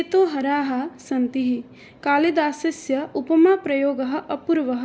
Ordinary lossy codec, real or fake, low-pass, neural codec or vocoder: none; real; none; none